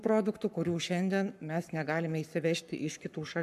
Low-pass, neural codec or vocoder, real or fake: 14.4 kHz; codec, 44.1 kHz, 7.8 kbps, Pupu-Codec; fake